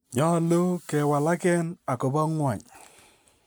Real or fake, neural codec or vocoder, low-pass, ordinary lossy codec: real; none; none; none